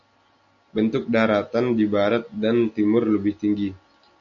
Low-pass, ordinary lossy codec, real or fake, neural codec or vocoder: 7.2 kHz; AAC, 64 kbps; real; none